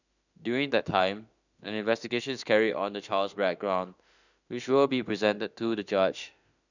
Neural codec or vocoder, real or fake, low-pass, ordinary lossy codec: autoencoder, 48 kHz, 32 numbers a frame, DAC-VAE, trained on Japanese speech; fake; 7.2 kHz; none